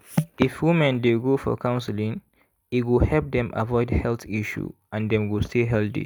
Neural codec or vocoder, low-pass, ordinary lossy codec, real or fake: none; none; none; real